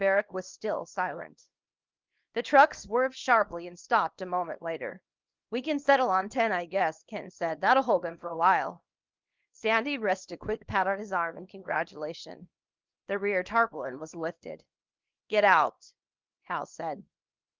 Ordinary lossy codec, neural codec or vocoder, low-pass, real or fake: Opus, 24 kbps; codec, 24 kHz, 0.9 kbps, WavTokenizer, small release; 7.2 kHz; fake